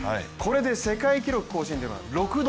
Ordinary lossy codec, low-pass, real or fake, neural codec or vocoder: none; none; real; none